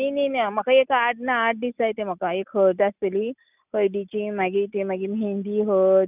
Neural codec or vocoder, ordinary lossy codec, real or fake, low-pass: none; none; real; 3.6 kHz